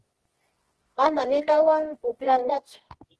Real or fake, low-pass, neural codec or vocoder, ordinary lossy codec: fake; 10.8 kHz; codec, 24 kHz, 0.9 kbps, WavTokenizer, medium music audio release; Opus, 16 kbps